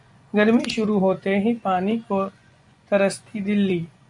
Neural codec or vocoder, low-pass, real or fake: vocoder, 24 kHz, 100 mel bands, Vocos; 10.8 kHz; fake